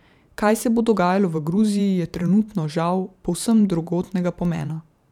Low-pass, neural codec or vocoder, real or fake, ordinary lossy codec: 19.8 kHz; vocoder, 44.1 kHz, 128 mel bands every 512 samples, BigVGAN v2; fake; none